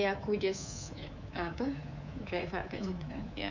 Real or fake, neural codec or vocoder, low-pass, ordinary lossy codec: fake; codec, 24 kHz, 3.1 kbps, DualCodec; 7.2 kHz; none